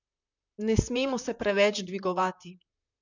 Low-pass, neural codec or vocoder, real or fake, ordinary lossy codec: 7.2 kHz; vocoder, 44.1 kHz, 128 mel bands, Pupu-Vocoder; fake; none